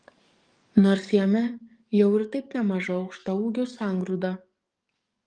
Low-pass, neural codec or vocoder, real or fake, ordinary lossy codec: 9.9 kHz; codec, 44.1 kHz, 7.8 kbps, DAC; fake; Opus, 32 kbps